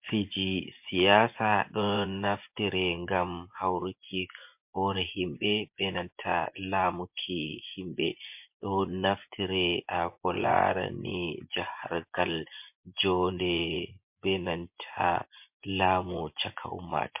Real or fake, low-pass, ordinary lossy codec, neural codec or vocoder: fake; 3.6 kHz; MP3, 32 kbps; vocoder, 24 kHz, 100 mel bands, Vocos